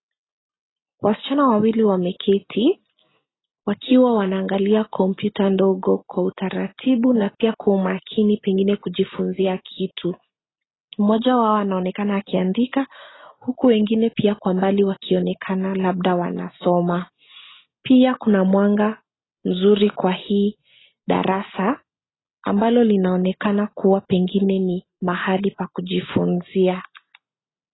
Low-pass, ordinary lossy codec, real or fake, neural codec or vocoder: 7.2 kHz; AAC, 16 kbps; real; none